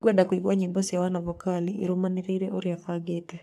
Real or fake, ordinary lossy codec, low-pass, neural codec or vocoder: fake; none; 14.4 kHz; codec, 44.1 kHz, 3.4 kbps, Pupu-Codec